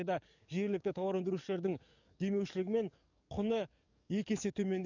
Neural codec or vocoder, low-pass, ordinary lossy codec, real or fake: codec, 44.1 kHz, 7.8 kbps, DAC; 7.2 kHz; none; fake